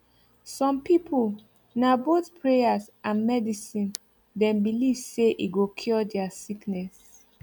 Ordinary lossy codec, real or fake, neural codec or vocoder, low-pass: none; real; none; 19.8 kHz